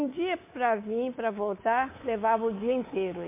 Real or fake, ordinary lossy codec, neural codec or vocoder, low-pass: fake; MP3, 24 kbps; codec, 24 kHz, 3.1 kbps, DualCodec; 3.6 kHz